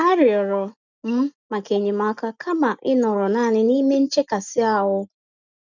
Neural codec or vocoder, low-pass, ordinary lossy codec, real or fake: none; 7.2 kHz; none; real